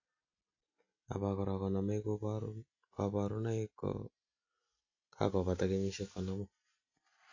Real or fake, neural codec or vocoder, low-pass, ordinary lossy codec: real; none; 7.2 kHz; AAC, 48 kbps